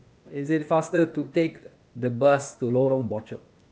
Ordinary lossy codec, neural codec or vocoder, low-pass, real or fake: none; codec, 16 kHz, 0.8 kbps, ZipCodec; none; fake